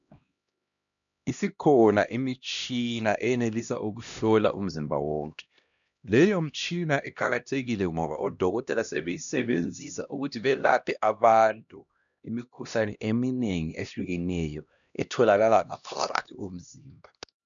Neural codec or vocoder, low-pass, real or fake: codec, 16 kHz, 1 kbps, X-Codec, HuBERT features, trained on LibriSpeech; 7.2 kHz; fake